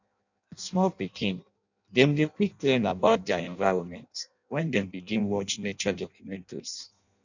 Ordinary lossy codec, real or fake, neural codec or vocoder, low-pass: none; fake; codec, 16 kHz in and 24 kHz out, 0.6 kbps, FireRedTTS-2 codec; 7.2 kHz